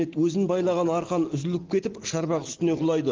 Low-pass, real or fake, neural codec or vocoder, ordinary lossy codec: 7.2 kHz; real; none; Opus, 16 kbps